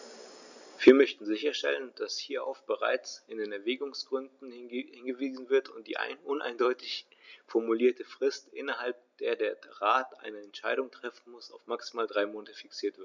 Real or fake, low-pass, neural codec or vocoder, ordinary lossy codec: real; 7.2 kHz; none; none